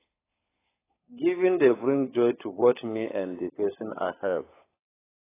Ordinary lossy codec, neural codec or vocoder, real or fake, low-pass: AAC, 16 kbps; codec, 16 kHz, 16 kbps, FunCodec, trained on LibriTTS, 50 frames a second; fake; 3.6 kHz